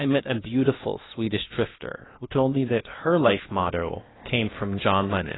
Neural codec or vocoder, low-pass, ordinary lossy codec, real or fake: codec, 16 kHz, 0.8 kbps, ZipCodec; 7.2 kHz; AAC, 16 kbps; fake